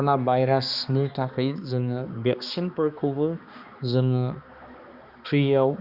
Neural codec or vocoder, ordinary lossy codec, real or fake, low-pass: codec, 16 kHz, 2 kbps, X-Codec, HuBERT features, trained on balanced general audio; Opus, 64 kbps; fake; 5.4 kHz